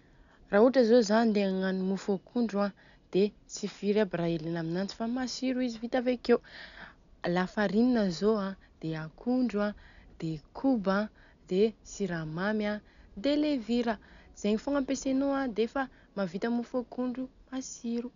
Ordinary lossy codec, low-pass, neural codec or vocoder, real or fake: none; 7.2 kHz; none; real